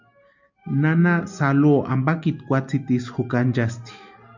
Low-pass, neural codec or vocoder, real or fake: 7.2 kHz; none; real